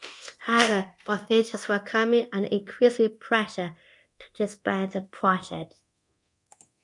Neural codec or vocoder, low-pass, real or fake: codec, 24 kHz, 0.9 kbps, DualCodec; 10.8 kHz; fake